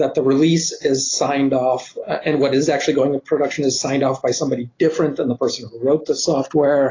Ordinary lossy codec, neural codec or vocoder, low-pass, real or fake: AAC, 32 kbps; none; 7.2 kHz; real